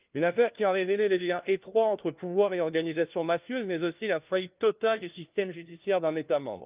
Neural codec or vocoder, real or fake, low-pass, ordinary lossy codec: codec, 16 kHz, 1 kbps, FunCodec, trained on LibriTTS, 50 frames a second; fake; 3.6 kHz; Opus, 24 kbps